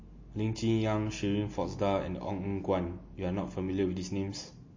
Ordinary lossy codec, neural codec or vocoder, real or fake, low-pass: MP3, 32 kbps; none; real; 7.2 kHz